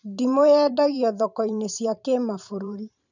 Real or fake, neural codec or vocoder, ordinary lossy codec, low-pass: fake; vocoder, 44.1 kHz, 128 mel bands every 512 samples, BigVGAN v2; none; 7.2 kHz